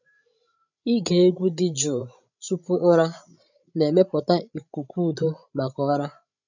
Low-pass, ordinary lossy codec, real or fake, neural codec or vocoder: 7.2 kHz; none; fake; codec, 16 kHz, 16 kbps, FreqCodec, larger model